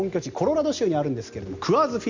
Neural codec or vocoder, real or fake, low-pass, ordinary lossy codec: none; real; 7.2 kHz; Opus, 64 kbps